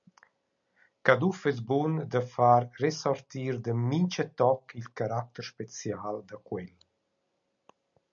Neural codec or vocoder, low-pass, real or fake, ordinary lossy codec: none; 7.2 kHz; real; MP3, 96 kbps